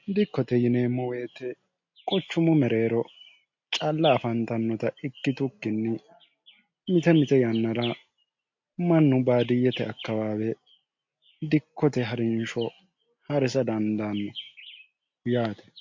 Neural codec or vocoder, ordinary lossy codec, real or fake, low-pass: none; MP3, 48 kbps; real; 7.2 kHz